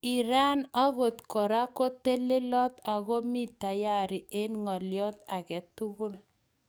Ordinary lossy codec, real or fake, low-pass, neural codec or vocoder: none; fake; none; codec, 44.1 kHz, 7.8 kbps, DAC